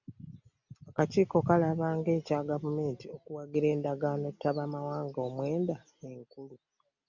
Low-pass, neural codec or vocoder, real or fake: 7.2 kHz; none; real